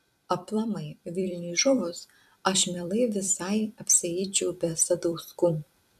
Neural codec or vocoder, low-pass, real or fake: vocoder, 44.1 kHz, 128 mel bands every 256 samples, BigVGAN v2; 14.4 kHz; fake